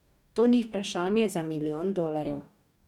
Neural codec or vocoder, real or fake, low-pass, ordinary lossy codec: codec, 44.1 kHz, 2.6 kbps, DAC; fake; 19.8 kHz; none